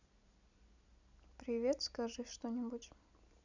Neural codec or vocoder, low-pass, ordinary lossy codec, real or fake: none; 7.2 kHz; none; real